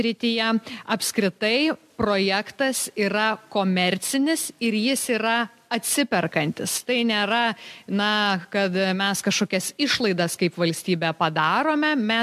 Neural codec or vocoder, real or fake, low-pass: none; real; 14.4 kHz